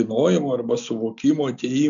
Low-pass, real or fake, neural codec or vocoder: 7.2 kHz; real; none